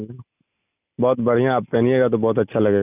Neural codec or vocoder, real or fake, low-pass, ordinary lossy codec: none; real; 3.6 kHz; none